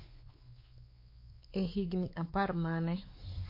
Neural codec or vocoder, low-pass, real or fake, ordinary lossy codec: codec, 16 kHz, 4 kbps, X-Codec, WavLM features, trained on Multilingual LibriSpeech; 5.4 kHz; fake; MP3, 32 kbps